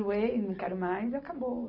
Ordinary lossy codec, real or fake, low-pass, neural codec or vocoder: none; real; 5.4 kHz; none